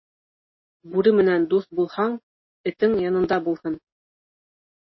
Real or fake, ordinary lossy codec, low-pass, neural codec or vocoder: real; MP3, 24 kbps; 7.2 kHz; none